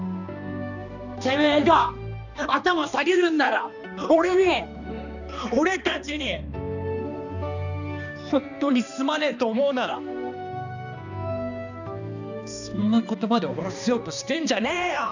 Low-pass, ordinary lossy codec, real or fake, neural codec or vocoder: 7.2 kHz; none; fake; codec, 16 kHz, 2 kbps, X-Codec, HuBERT features, trained on balanced general audio